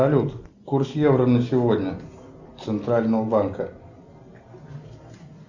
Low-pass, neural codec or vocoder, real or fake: 7.2 kHz; none; real